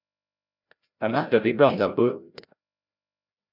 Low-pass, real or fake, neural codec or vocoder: 5.4 kHz; fake; codec, 16 kHz, 0.5 kbps, FreqCodec, larger model